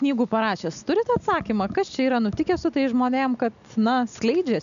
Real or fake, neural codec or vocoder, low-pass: real; none; 7.2 kHz